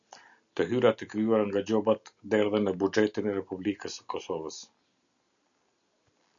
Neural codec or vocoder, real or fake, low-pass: none; real; 7.2 kHz